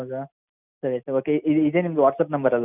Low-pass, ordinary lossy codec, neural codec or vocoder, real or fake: 3.6 kHz; none; none; real